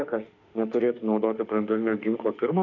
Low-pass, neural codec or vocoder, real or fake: 7.2 kHz; autoencoder, 48 kHz, 32 numbers a frame, DAC-VAE, trained on Japanese speech; fake